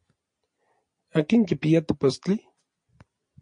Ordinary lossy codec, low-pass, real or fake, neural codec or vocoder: MP3, 48 kbps; 9.9 kHz; real; none